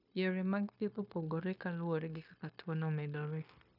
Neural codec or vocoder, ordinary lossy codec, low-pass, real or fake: codec, 16 kHz, 0.9 kbps, LongCat-Audio-Codec; none; 5.4 kHz; fake